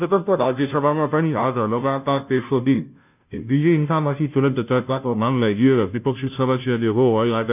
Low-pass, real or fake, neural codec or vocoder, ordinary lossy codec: 3.6 kHz; fake; codec, 16 kHz, 0.5 kbps, FunCodec, trained on Chinese and English, 25 frames a second; none